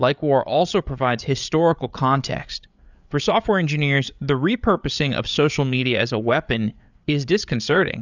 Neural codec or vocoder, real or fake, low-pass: codec, 16 kHz, 8 kbps, FreqCodec, larger model; fake; 7.2 kHz